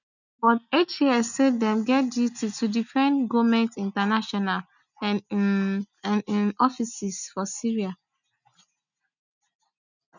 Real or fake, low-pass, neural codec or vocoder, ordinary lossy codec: real; 7.2 kHz; none; none